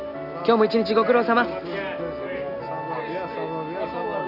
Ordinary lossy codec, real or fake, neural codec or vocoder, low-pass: none; real; none; 5.4 kHz